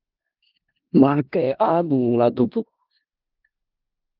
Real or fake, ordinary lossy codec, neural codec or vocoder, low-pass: fake; Opus, 24 kbps; codec, 16 kHz in and 24 kHz out, 0.4 kbps, LongCat-Audio-Codec, four codebook decoder; 5.4 kHz